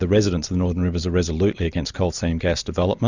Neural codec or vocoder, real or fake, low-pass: none; real; 7.2 kHz